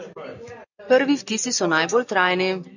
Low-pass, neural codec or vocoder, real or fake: 7.2 kHz; none; real